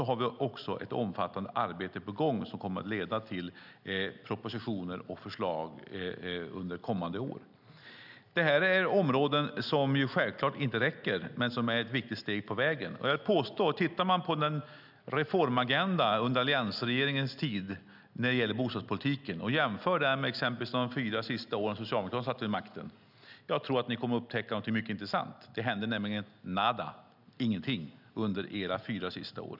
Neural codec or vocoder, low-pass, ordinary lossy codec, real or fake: none; 5.4 kHz; none; real